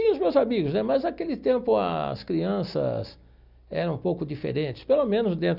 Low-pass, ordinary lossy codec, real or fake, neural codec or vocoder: 5.4 kHz; none; real; none